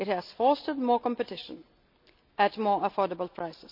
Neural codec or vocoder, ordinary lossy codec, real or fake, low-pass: none; none; real; 5.4 kHz